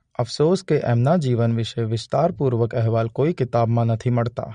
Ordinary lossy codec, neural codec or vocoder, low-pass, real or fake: MP3, 48 kbps; none; 9.9 kHz; real